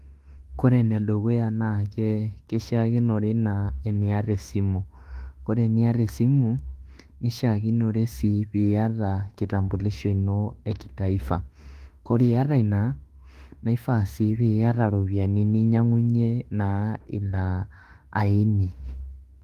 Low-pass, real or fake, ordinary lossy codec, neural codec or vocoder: 14.4 kHz; fake; Opus, 24 kbps; autoencoder, 48 kHz, 32 numbers a frame, DAC-VAE, trained on Japanese speech